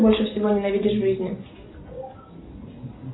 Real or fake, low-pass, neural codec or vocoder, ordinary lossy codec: real; 7.2 kHz; none; AAC, 16 kbps